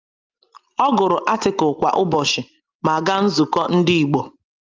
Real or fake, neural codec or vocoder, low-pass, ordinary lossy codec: real; none; 7.2 kHz; Opus, 32 kbps